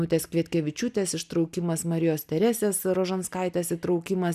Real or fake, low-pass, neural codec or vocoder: real; 14.4 kHz; none